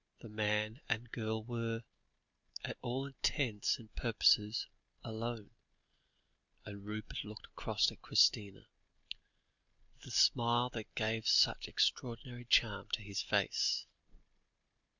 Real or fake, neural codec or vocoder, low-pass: real; none; 7.2 kHz